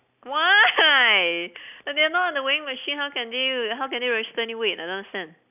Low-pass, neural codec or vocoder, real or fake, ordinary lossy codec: 3.6 kHz; none; real; none